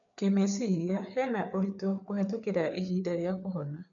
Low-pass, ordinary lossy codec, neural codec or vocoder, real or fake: 7.2 kHz; none; codec, 16 kHz, 4 kbps, FreqCodec, larger model; fake